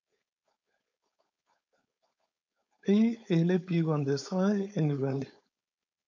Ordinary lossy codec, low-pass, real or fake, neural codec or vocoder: MP3, 64 kbps; 7.2 kHz; fake; codec, 16 kHz, 4.8 kbps, FACodec